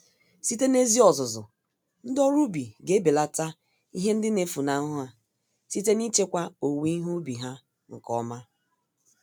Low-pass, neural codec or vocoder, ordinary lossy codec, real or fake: none; none; none; real